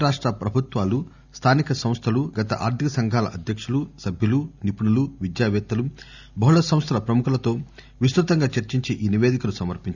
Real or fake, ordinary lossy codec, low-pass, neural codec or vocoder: real; none; 7.2 kHz; none